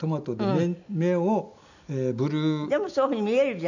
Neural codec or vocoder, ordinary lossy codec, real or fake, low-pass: none; none; real; 7.2 kHz